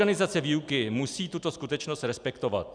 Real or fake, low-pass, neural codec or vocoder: real; 9.9 kHz; none